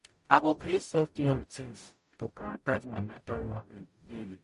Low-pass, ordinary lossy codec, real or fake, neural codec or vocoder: 14.4 kHz; MP3, 48 kbps; fake; codec, 44.1 kHz, 0.9 kbps, DAC